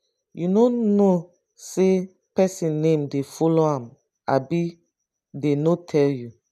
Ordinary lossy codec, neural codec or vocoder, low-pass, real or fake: none; none; 14.4 kHz; real